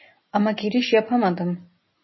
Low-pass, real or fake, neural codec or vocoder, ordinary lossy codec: 7.2 kHz; real; none; MP3, 24 kbps